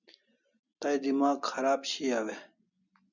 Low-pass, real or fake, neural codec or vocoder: 7.2 kHz; real; none